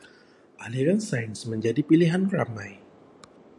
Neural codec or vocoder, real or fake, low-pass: none; real; 10.8 kHz